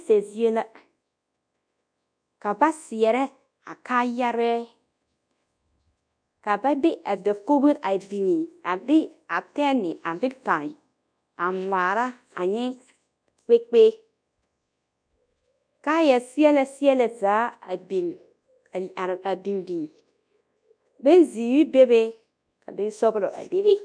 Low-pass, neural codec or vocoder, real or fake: 9.9 kHz; codec, 24 kHz, 0.9 kbps, WavTokenizer, large speech release; fake